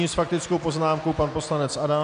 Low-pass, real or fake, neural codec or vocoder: 9.9 kHz; real; none